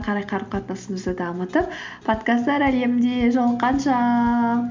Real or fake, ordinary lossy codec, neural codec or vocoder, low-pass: real; MP3, 64 kbps; none; 7.2 kHz